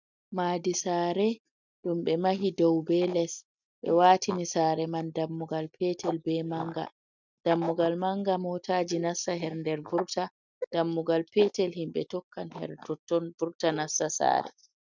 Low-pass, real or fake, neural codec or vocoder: 7.2 kHz; real; none